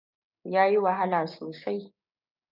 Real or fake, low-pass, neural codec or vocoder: fake; 5.4 kHz; codec, 16 kHz, 6 kbps, DAC